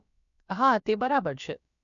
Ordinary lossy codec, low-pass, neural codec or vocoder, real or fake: none; 7.2 kHz; codec, 16 kHz, about 1 kbps, DyCAST, with the encoder's durations; fake